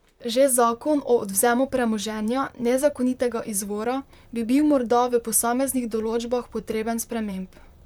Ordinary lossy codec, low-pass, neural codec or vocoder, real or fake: none; 19.8 kHz; vocoder, 44.1 kHz, 128 mel bands, Pupu-Vocoder; fake